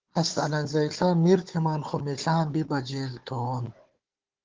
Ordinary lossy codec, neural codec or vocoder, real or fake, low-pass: Opus, 16 kbps; codec, 16 kHz, 4 kbps, FunCodec, trained on Chinese and English, 50 frames a second; fake; 7.2 kHz